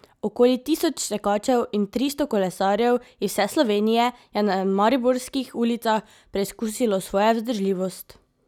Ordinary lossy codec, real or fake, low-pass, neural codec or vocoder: none; real; 19.8 kHz; none